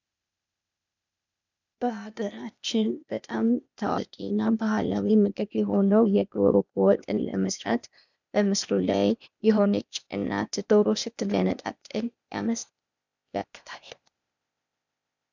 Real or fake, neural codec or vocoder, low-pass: fake; codec, 16 kHz, 0.8 kbps, ZipCodec; 7.2 kHz